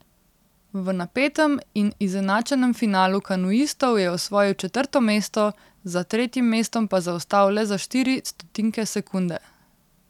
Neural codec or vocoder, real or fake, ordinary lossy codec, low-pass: none; real; none; 19.8 kHz